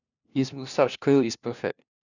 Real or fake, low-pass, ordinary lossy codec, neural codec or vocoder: fake; 7.2 kHz; none; codec, 16 kHz, 1 kbps, FunCodec, trained on LibriTTS, 50 frames a second